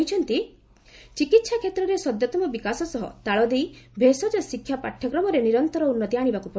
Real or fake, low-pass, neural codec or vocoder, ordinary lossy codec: real; none; none; none